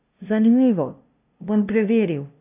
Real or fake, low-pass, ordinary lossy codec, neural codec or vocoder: fake; 3.6 kHz; AAC, 32 kbps; codec, 16 kHz, 0.5 kbps, FunCodec, trained on LibriTTS, 25 frames a second